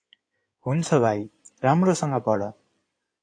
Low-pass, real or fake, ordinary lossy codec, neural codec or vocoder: 9.9 kHz; fake; AAC, 48 kbps; codec, 16 kHz in and 24 kHz out, 2.2 kbps, FireRedTTS-2 codec